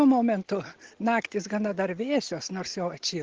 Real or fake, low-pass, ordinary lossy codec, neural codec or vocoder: real; 7.2 kHz; Opus, 16 kbps; none